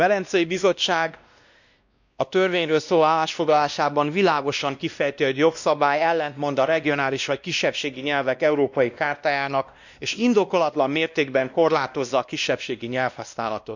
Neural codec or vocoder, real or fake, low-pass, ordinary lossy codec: codec, 16 kHz, 1 kbps, X-Codec, WavLM features, trained on Multilingual LibriSpeech; fake; 7.2 kHz; none